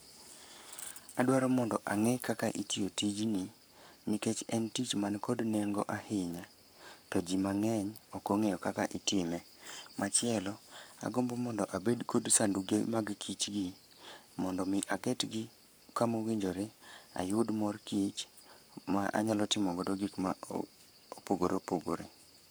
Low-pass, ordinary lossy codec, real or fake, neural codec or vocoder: none; none; fake; codec, 44.1 kHz, 7.8 kbps, Pupu-Codec